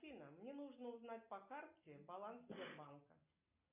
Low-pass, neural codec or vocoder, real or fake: 3.6 kHz; none; real